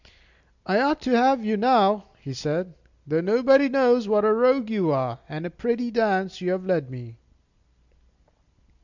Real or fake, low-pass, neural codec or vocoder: real; 7.2 kHz; none